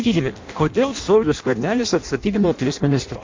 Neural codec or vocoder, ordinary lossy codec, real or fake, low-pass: codec, 16 kHz in and 24 kHz out, 0.6 kbps, FireRedTTS-2 codec; MP3, 48 kbps; fake; 7.2 kHz